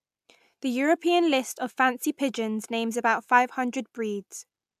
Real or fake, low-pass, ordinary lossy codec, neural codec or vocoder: real; 14.4 kHz; AAC, 96 kbps; none